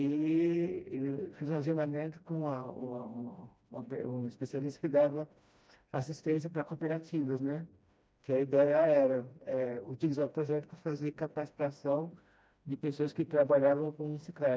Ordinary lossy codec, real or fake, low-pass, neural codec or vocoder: none; fake; none; codec, 16 kHz, 1 kbps, FreqCodec, smaller model